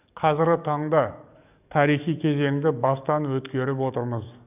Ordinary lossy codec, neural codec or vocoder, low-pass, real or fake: none; codec, 44.1 kHz, 7.8 kbps, DAC; 3.6 kHz; fake